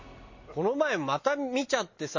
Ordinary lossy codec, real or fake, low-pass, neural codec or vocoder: MP3, 32 kbps; real; 7.2 kHz; none